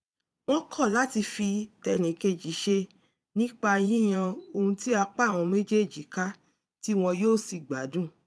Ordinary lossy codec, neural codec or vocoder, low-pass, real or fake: none; vocoder, 22.05 kHz, 80 mel bands, Vocos; none; fake